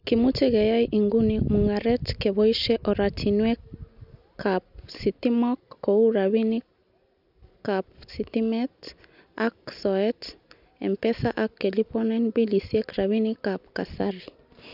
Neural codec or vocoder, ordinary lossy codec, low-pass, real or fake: none; none; 5.4 kHz; real